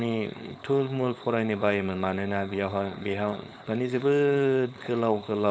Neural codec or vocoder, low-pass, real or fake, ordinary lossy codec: codec, 16 kHz, 4.8 kbps, FACodec; none; fake; none